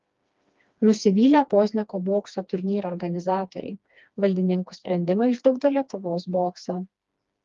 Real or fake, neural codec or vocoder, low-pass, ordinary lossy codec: fake; codec, 16 kHz, 2 kbps, FreqCodec, smaller model; 7.2 kHz; Opus, 32 kbps